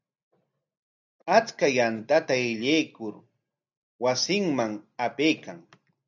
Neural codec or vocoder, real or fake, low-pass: none; real; 7.2 kHz